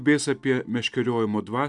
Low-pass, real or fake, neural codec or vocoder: 10.8 kHz; real; none